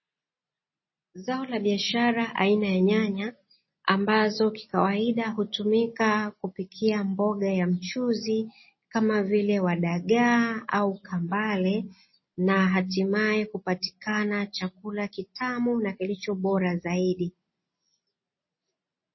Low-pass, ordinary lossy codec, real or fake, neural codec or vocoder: 7.2 kHz; MP3, 24 kbps; real; none